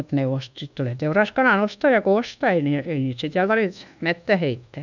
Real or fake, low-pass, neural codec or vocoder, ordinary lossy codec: fake; 7.2 kHz; codec, 24 kHz, 1.2 kbps, DualCodec; none